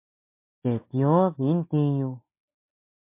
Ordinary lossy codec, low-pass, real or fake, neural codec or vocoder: MP3, 32 kbps; 3.6 kHz; real; none